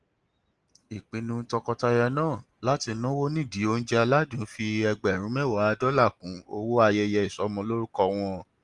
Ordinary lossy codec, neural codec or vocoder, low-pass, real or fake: Opus, 24 kbps; none; 10.8 kHz; real